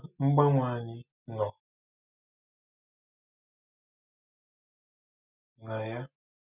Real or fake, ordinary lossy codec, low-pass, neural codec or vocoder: real; none; 3.6 kHz; none